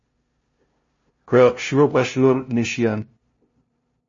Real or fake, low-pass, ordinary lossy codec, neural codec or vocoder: fake; 7.2 kHz; MP3, 32 kbps; codec, 16 kHz, 0.5 kbps, FunCodec, trained on LibriTTS, 25 frames a second